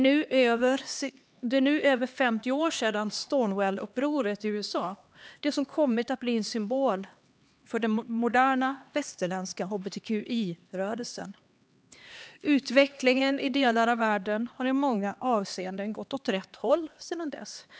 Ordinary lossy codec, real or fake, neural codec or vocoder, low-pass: none; fake; codec, 16 kHz, 2 kbps, X-Codec, HuBERT features, trained on LibriSpeech; none